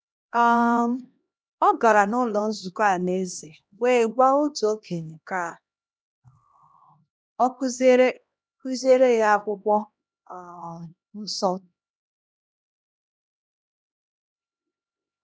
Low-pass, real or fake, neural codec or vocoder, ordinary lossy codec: none; fake; codec, 16 kHz, 1 kbps, X-Codec, HuBERT features, trained on LibriSpeech; none